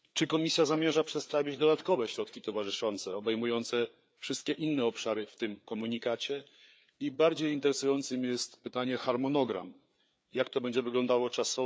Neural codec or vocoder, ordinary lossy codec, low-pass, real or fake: codec, 16 kHz, 4 kbps, FreqCodec, larger model; none; none; fake